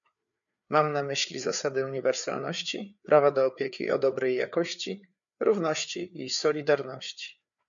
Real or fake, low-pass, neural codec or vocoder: fake; 7.2 kHz; codec, 16 kHz, 4 kbps, FreqCodec, larger model